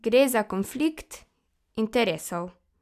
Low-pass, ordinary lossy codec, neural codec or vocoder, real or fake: 14.4 kHz; none; none; real